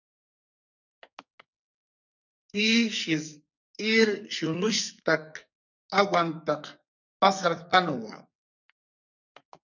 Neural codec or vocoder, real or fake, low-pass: codec, 44.1 kHz, 2.6 kbps, SNAC; fake; 7.2 kHz